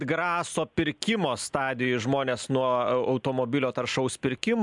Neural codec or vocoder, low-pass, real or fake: none; 10.8 kHz; real